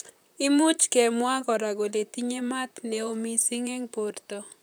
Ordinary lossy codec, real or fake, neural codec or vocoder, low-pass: none; fake; vocoder, 44.1 kHz, 128 mel bands, Pupu-Vocoder; none